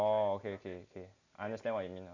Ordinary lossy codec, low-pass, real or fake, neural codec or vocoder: none; 7.2 kHz; real; none